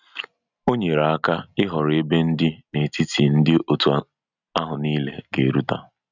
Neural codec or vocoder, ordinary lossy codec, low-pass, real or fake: none; none; 7.2 kHz; real